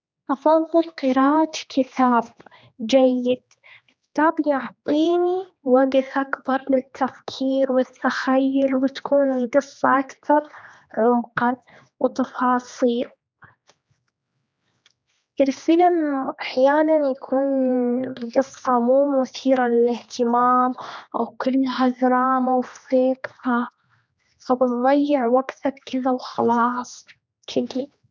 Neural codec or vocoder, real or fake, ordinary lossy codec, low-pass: codec, 16 kHz, 2 kbps, X-Codec, HuBERT features, trained on general audio; fake; none; none